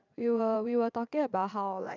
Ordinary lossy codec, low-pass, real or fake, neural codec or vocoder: none; 7.2 kHz; fake; vocoder, 22.05 kHz, 80 mel bands, Vocos